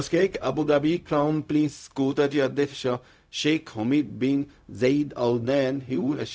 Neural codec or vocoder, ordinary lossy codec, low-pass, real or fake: codec, 16 kHz, 0.4 kbps, LongCat-Audio-Codec; none; none; fake